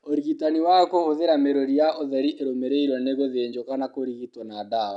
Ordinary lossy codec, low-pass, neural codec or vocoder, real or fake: none; 10.8 kHz; none; real